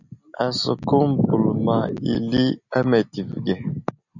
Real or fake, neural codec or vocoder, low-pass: real; none; 7.2 kHz